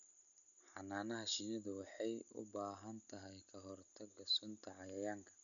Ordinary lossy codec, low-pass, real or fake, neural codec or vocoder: none; 7.2 kHz; real; none